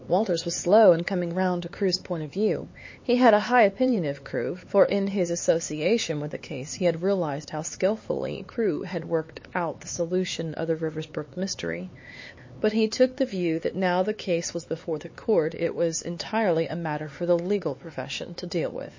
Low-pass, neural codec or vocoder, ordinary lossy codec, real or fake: 7.2 kHz; codec, 16 kHz, 4 kbps, X-Codec, HuBERT features, trained on LibriSpeech; MP3, 32 kbps; fake